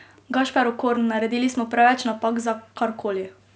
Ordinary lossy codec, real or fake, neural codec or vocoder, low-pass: none; real; none; none